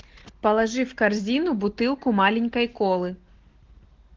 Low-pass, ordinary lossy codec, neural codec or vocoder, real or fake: 7.2 kHz; Opus, 16 kbps; none; real